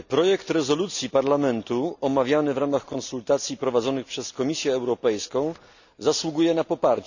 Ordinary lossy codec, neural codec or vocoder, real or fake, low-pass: none; none; real; 7.2 kHz